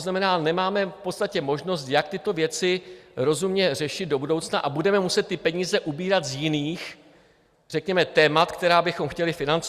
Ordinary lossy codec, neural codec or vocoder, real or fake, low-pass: Opus, 64 kbps; none; real; 14.4 kHz